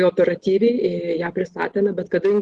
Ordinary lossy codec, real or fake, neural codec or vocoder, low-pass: Opus, 16 kbps; real; none; 10.8 kHz